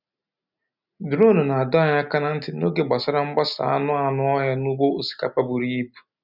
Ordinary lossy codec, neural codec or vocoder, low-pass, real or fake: none; none; 5.4 kHz; real